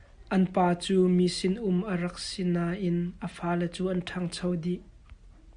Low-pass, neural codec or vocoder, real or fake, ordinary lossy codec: 9.9 kHz; none; real; Opus, 64 kbps